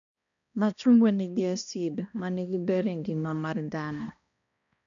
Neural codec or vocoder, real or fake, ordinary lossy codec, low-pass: codec, 16 kHz, 1 kbps, X-Codec, HuBERT features, trained on balanced general audio; fake; none; 7.2 kHz